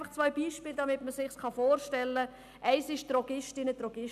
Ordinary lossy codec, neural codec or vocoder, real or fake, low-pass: none; none; real; 14.4 kHz